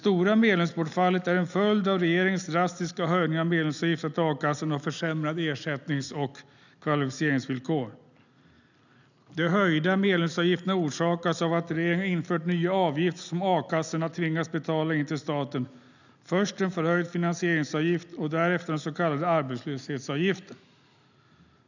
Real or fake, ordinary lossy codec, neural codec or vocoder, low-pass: real; none; none; 7.2 kHz